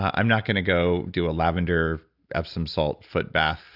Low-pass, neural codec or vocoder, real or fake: 5.4 kHz; none; real